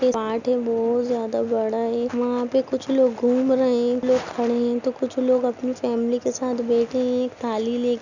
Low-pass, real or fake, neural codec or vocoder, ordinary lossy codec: 7.2 kHz; real; none; none